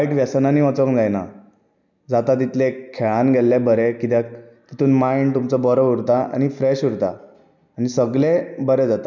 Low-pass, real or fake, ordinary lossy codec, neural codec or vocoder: 7.2 kHz; real; none; none